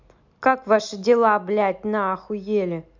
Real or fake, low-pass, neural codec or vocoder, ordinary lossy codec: real; 7.2 kHz; none; MP3, 64 kbps